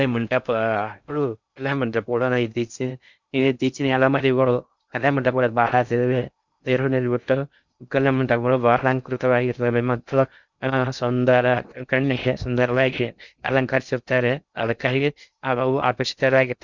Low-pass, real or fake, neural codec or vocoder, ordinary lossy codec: 7.2 kHz; fake; codec, 16 kHz in and 24 kHz out, 0.6 kbps, FocalCodec, streaming, 2048 codes; Opus, 64 kbps